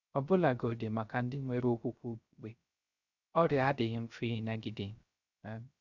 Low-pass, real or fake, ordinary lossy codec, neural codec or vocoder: 7.2 kHz; fake; none; codec, 16 kHz, 0.3 kbps, FocalCodec